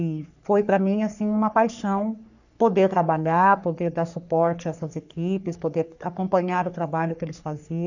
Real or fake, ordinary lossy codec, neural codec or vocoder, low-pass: fake; none; codec, 44.1 kHz, 3.4 kbps, Pupu-Codec; 7.2 kHz